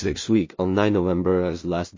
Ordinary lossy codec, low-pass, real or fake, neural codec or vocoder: MP3, 32 kbps; 7.2 kHz; fake; codec, 16 kHz in and 24 kHz out, 0.4 kbps, LongCat-Audio-Codec, two codebook decoder